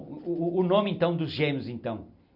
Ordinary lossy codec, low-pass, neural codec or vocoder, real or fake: none; 5.4 kHz; none; real